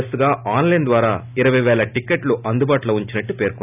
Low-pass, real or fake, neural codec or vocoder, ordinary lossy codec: 3.6 kHz; real; none; none